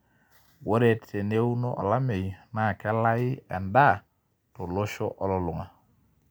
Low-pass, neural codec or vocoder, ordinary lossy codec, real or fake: none; none; none; real